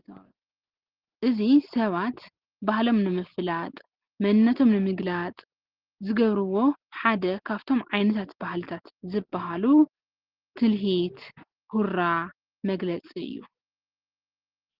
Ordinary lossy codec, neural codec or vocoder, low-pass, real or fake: Opus, 16 kbps; none; 5.4 kHz; real